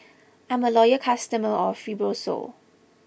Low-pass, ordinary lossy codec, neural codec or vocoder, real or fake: none; none; none; real